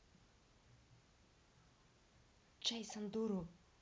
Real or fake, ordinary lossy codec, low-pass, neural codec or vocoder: real; none; none; none